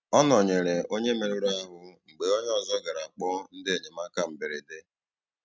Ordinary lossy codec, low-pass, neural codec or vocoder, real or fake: none; none; none; real